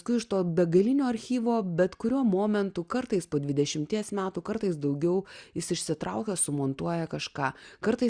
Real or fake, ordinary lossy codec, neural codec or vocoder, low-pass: real; Opus, 64 kbps; none; 9.9 kHz